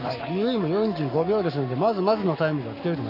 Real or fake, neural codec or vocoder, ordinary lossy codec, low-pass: fake; codec, 44.1 kHz, 7.8 kbps, Pupu-Codec; none; 5.4 kHz